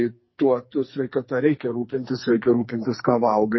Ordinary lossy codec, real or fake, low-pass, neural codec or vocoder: MP3, 24 kbps; fake; 7.2 kHz; codec, 44.1 kHz, 2.6 kbps, SNAC